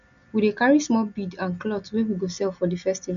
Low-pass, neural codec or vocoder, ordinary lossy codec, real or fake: 7.2 kHz; none; none; real